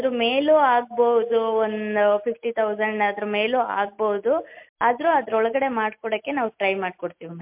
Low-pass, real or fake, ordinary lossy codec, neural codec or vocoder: 3.6 kHz; real; MP3, 32 kbps; none